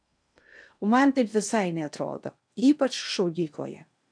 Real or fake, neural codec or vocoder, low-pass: fake; codec, 16 kHz in and 24 kHz out, 0.6 kbps, FocalCodec, streaming, 2048 codes; 9.9 kHz